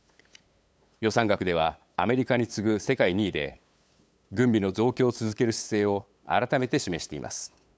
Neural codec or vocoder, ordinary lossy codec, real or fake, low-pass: codec, 16 kHz, 8 kbps, FunCodec, trained on LibriTTS, 25 frames a second; none; fake; none